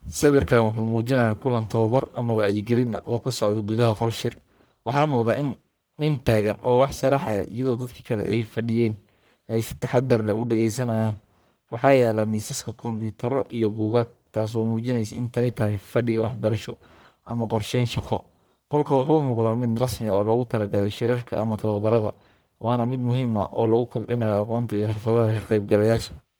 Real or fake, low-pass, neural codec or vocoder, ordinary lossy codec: fake; none; codec, 44.1 kHz, 1.7 kbps, Pupu-Codec; none